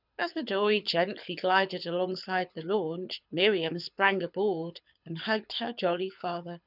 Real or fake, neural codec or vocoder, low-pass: fake; codec, 24 kHz, 6 kbps, HILCodec; 5.4 kHz